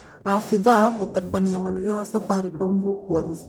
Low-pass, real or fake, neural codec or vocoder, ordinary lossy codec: none; fake; codec, 44.1 kHz, 0.9 kbps, DAC; none